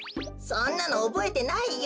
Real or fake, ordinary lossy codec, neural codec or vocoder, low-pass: real; none; none; none